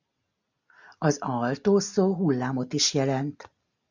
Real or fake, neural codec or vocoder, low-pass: real; none; 7.2 kHz